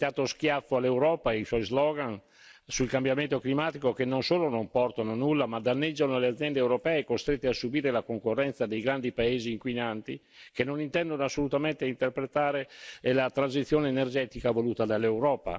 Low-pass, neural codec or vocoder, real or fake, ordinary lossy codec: none; none; real; none